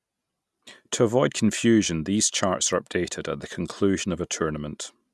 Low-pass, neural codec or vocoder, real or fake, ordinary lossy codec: none; none; real; none